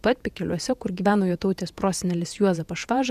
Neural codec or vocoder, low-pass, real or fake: vocoder, 48 kHz, 128 mel bands, Vocos; 14.4 kHz; fake